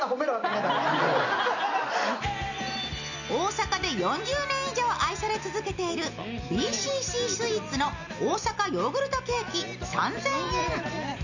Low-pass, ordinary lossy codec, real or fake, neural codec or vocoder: 7.2 kHz; none; real; none